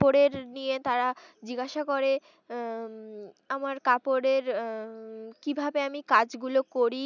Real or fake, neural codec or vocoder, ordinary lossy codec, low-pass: real; none; none; 7.2 kHz